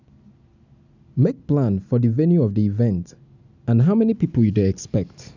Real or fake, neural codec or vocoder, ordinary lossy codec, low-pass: real; none; none; 7.2 kHz